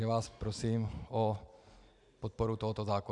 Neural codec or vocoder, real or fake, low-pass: none; real; 10.8 kHz